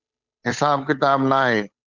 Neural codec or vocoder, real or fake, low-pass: codec, 16 kHz, 8 kbps, FunCodec, trained on Chinese and English, 25 frames a second; fake; 7.2 kHz